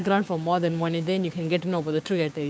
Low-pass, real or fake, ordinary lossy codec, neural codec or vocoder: none; fake; none; codec, 16 kHz, 6 kbps, DAC